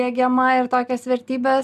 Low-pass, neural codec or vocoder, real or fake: 14.4 kHz; none; real